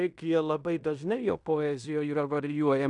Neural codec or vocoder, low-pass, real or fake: codec, 16 kHz in and 24 kHz out, 0.9 kbps, LongCat-Audio-Codec, fine tuned four codebook decoder; 10.8 kHz; fake